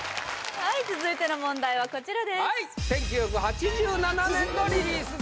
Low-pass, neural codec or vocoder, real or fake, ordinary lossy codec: none; none; real; none